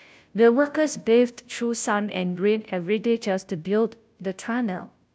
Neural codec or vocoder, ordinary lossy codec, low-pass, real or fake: codec, 16 kHz, 0.5 kbps, FunCodec, trained on Chinese and English, 25 frames a second; none; none; fake